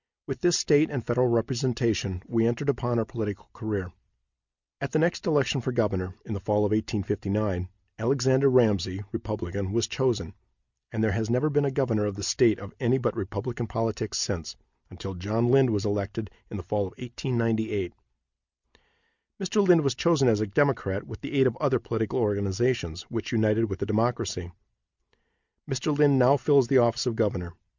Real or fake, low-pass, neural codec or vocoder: real; 7.2 kHz; none